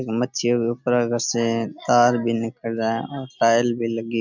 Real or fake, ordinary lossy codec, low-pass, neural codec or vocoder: real; none; 7.2 kHz; none